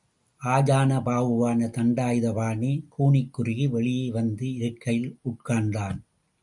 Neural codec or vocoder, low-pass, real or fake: none; 10.8 kHz; real